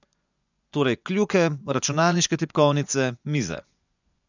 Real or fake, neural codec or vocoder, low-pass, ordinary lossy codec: fake; vocoder, 24 kHz, 100 mel bands, Vocos; 7.2 kHz; none